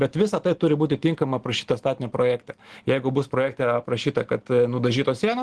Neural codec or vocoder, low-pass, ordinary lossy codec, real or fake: none; 10.8 kHz; Opus, 16 kbps; real